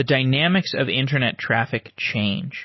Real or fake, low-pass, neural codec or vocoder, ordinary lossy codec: real; 7.2 kHz; none; MP3, 24 kbps